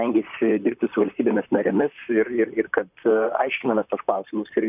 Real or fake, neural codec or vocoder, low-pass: fake; vocoder, 44.1 kHz, 128 mel bands, Pupu-Vocoder; 3.6 kHz